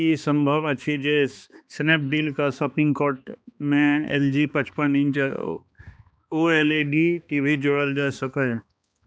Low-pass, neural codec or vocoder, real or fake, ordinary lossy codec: none; codec, 16 kHz, 2 kbps, X-Codec, HuBERT features, trained on balanced general audio; fake; none